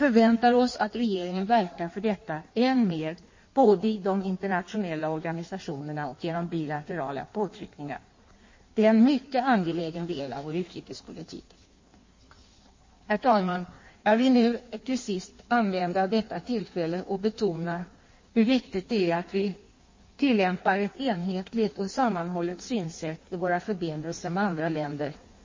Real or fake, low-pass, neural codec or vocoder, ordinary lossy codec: fake; 7.2 kHz; codec, 16 kHz in and 24 kHz out, 1.1 kbps, FireRedTTS-2 codec; MP3, 32 kbps